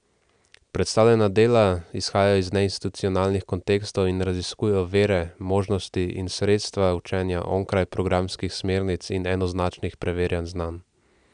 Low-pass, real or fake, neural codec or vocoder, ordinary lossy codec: 9.9 kHz; real; none; none